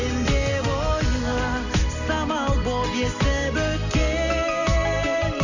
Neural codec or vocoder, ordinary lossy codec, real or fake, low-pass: none; none; real; 7.2 kHz